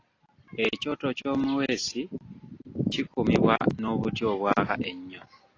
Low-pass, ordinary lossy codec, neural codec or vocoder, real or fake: 7.2 kHz; AAC, 32 kbps; none; real